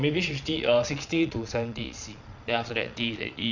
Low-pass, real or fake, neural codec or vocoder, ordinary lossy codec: 7.2 kHz; fake; vocoder, 22.05 kHz, 80 mel bands, Vocos; none